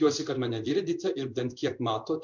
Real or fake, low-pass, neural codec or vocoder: fake; 7.2 kHz; codec, 16 kHz in and 24 kHz out, 1 kbps, XY-Tokenizer